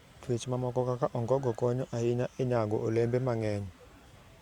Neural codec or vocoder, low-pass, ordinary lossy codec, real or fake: vocoder, 44.1 kHz, 128 mel bands every 512 samples, BigVGAN v2; 19.8 kHz; Opus, 64 kbps; fake